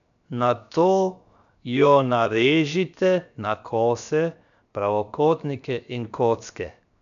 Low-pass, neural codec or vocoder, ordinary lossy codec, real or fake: 7.2 kHz; codec, 16 kHz, 0.7 kbps, FocalCodec; none; fake